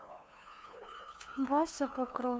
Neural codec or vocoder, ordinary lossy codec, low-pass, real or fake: codec, 16 kHz, 2 kbps, FunCodec, trained on LibriTTS, 25 frames a second; none; none; fake